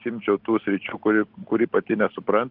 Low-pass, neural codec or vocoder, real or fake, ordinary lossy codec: 5.4 kHz; none; real; Opus, 16 kbps